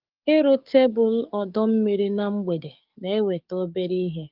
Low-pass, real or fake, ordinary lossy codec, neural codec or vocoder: 5.4 kHz; fake; Opus, 32 kbps; codec, 44.1 kHz, 7.8 kbps, DAC